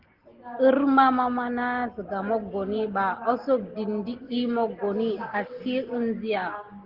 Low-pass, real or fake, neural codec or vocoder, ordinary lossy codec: 5.4 kHz; real; none; Opus, 16 kbps